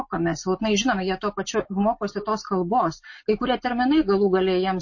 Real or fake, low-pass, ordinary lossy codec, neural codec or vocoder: real; 7.2 kHz; MP3, 32 kbps; none